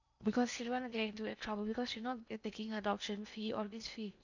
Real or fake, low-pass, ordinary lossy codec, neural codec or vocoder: fake; 7.2 kHz; none; codec, 16 kHz in and 24 kHz out, 0.8 kbps, FocalCodec, streaming, 65536 codes